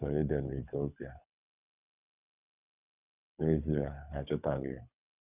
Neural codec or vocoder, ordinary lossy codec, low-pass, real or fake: codec, 24 kHz, 3 kbps, HILCodec; none; 3.6 kHz; fake